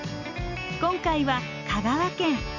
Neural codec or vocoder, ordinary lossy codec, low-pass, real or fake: none; none; 7.2 kHz; real